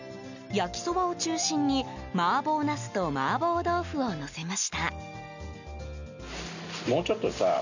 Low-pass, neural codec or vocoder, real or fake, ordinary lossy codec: 7.2 kHz; none; real; none